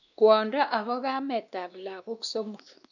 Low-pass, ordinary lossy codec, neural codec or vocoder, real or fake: 7.2 kHz; none; codec, 16 kHz, 2 kbps, X-Codec, WavLM features, trained on Multilingual LibriSpeech; fake